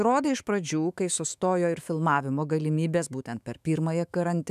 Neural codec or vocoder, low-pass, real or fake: autoencoder, 48 kHz, 128 numbers a frame, DAC-VAE, trained on Japanese speech; 14.4 kHz; fake